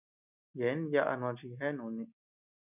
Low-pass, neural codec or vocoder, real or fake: 3.6 kHz; none; real